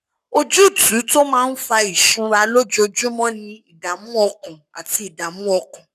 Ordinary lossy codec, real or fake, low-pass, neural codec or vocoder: none; fake; 14.4 kHz; codec, 44.1 kHz, 7.8 kbps, Pupu-Codec